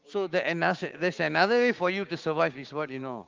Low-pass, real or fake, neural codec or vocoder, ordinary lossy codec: 7.2 kHz; fake; codec, 16 kHz, 6 kbps, DAC; Opus, 32 kbps